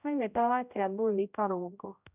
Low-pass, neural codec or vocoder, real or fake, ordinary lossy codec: 3.6 kHz; codec, 16 kHz, 0.5 kbps, X-Codec, HuBERT features, trained on general audio; fake; none